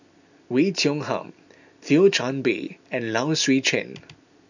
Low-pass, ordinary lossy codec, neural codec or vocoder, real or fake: 7.2 kHz; none; none; real